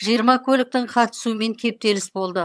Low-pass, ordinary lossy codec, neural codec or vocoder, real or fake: none; none; vocoder, 22.05 kHz, 80 mel bands, HiFi-GAN; fake